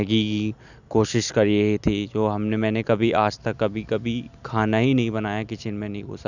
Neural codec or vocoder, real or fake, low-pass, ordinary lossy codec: none; real; 7.2 kHz; none